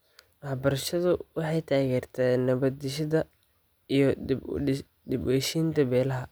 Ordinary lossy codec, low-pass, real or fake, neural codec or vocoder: none; none; real; none